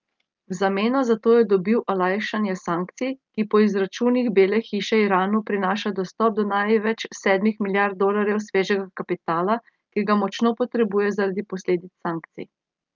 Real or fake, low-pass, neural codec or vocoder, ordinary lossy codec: real; 7.2 kHz; none; Opus, 32 kbps